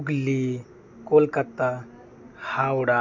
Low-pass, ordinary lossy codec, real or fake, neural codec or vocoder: 7.2 kHz; none; real; none